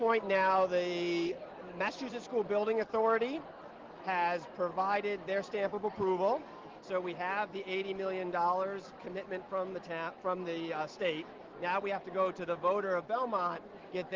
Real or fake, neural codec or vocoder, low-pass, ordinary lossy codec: real; none; 7.2 kHz; Opus, 16 kbps